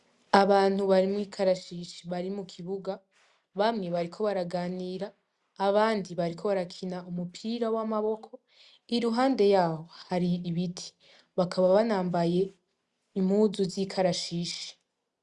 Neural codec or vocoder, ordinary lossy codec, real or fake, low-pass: none; Opus, 64 kbps; real; 10.8 kHz